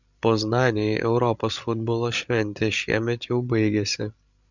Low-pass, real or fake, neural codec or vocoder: 7.2 kHz; fake; codec, 16 kHz, 16 kbps, FreqCodec, larger model